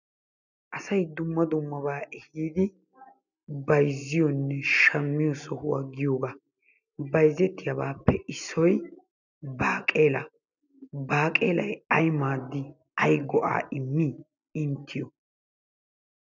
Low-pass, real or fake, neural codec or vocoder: 7.2 kHz; real; none